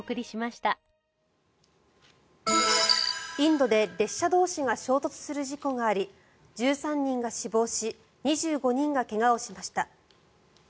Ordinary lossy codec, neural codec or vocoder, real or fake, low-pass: none; none; real; none